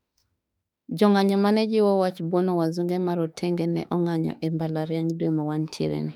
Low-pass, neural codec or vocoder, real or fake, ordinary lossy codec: 19.8 kHz; autoencoder, 48 kHz, 32 numbers a frame, DAC-VAE, trained on Japanese speech; fake; none